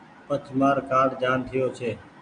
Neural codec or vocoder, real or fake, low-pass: none; real; 9.9 kHz